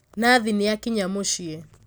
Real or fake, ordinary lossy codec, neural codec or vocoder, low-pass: real; none; none; none